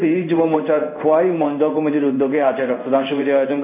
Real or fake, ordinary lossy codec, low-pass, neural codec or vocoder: fake; none; 3.6 kHz; codec, 16 kHz in and 24 kHz out, 1 kbps, XY-Tokenizer